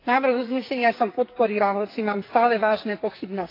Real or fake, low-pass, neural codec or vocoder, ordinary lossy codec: fake; 5.4 kHz; codec, 44.1 kHz, 2.6 kbps, SNAC; AAC, 32 kbps